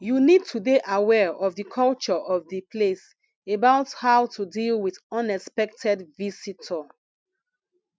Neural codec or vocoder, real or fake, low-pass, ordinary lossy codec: none; real; none; none